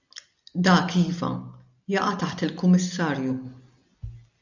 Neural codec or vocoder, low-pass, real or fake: none; 7.2 kHz; real